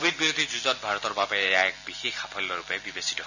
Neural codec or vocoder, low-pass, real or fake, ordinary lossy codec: none; 7.2 kHz; real; none